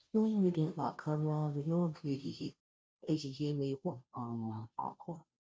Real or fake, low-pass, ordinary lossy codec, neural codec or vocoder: fake; none; none; codec, 16 kHz, 0.5 kbps, FunCodec, trained on Chinese and English, 25 frames a second